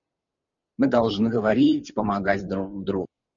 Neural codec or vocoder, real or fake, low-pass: none; real; 7.2 kHz